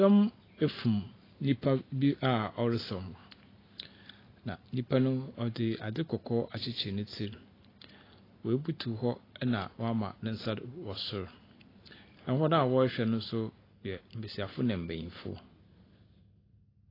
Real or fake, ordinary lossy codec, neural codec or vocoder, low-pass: real; AAC, 24 kbps; none; 5.4 kHz